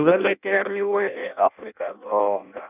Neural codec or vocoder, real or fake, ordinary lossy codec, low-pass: codec, 16 kHz in and 24 kHz out, 0.6 kbps, FireRedTTS-2 codec; fake; none; 3.6 kHz